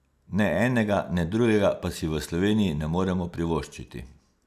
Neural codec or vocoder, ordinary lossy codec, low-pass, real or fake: none; none; 14.4 kHz; real